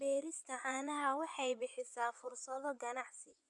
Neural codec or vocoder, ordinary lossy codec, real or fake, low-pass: vocoder, 24 kHz, 100 mel bands, Vocos; none; fake; 10.8 kHz